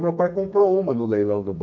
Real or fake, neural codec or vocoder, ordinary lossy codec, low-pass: fake; codec, 32 kHz, 1.9 kbps, SNAC; none; 7.2 kHz